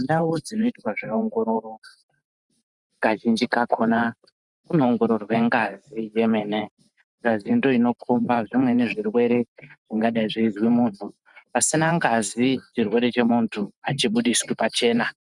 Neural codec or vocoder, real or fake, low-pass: vocoder, 44.1 kHz, 128 mel bands every 512 samples, BigVGAN v2; fake; 10.8 kHz